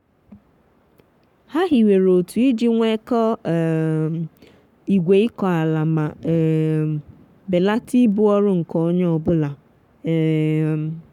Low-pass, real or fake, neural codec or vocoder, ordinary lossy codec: 19.8 kHz; fake; codec, 44.1 kHz, 7.8 kbps, Pupu-Codec; none